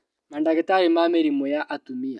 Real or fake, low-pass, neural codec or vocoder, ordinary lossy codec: real; none; none; none